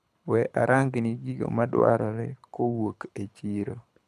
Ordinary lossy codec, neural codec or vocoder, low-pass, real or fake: none; codec, 24 kHz, 6 kbps, HILCodec; none; fake